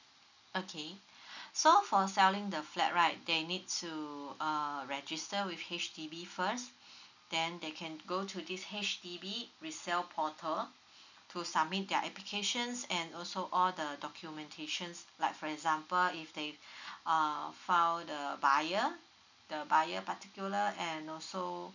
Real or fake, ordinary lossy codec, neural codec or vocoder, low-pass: real; none; none; 7.2 kHz